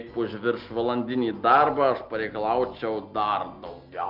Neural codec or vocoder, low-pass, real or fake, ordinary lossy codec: none; 5.4 kHz; real; Opus, 24 kbps